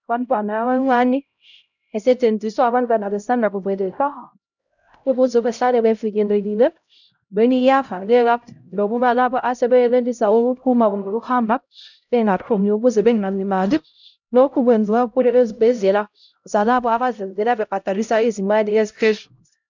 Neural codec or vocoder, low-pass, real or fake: codec, 16 kHz, 0.5 kbps, X-Codec, HuBERT features, trained on LibriSpeech; 7.2 kHz; fake